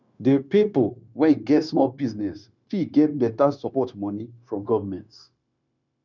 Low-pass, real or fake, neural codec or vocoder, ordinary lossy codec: 7.2 kHz; fake; codec, 16 kHz, 0.9 kbps, LongCat-Audio-Codec; none